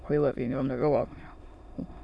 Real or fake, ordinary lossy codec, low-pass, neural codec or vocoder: fake; none; none; autoencoder, 22.05 kHz, a latent of 192 numbers a frame, VITS, trained on many speakers